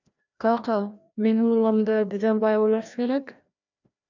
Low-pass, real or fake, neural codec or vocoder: 7.2 kHz; fake; codec, 16 kHz, 1 kbps, FreqCodec, larger model